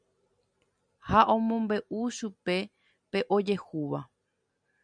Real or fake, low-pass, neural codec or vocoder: real; 9.9 kHz; none